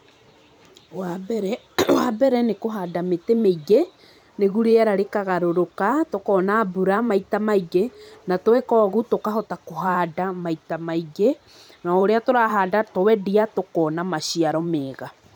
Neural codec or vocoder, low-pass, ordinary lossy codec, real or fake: vocoder, 44.1 kHz, 128 mel bands every 512 samples, BigVGAN v2; none; none; fake